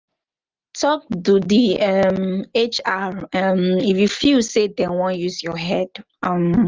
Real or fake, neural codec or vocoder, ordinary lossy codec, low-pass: real; none; Opus, 32 kbps; 7.2 kHz